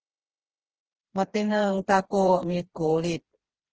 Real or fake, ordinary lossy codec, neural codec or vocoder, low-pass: fake; Opus, 16 kbps; codec, 16 kHz, 2 kbps, FreqCodec, smaller model; 7.2 kHz